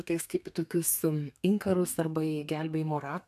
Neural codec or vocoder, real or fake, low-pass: codec, 32 kHz, 1.9 kbps, SNAC; fake; 14.4 kHz